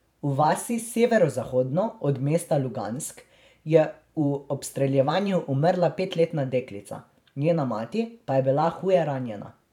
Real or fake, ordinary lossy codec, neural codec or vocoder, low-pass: fake; none; vocoder, 44.1 kHz, 128 mel bands every 512 samples, BigVGAN v2; 19.8 kHz